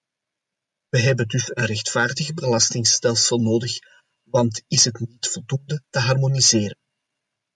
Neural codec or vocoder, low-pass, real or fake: none; 10.8 kHz; real